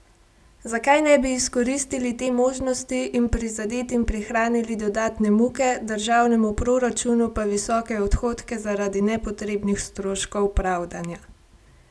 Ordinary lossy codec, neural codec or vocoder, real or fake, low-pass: none; none; real; none